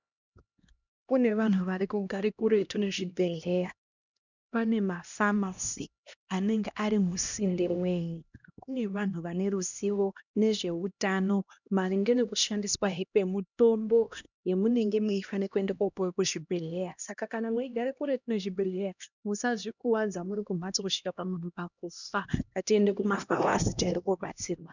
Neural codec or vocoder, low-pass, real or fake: codec, 16 kHz, 1 kbps, X-Codec, HuBERT features, trained on LibriSpeech; 7.2 kHz; fake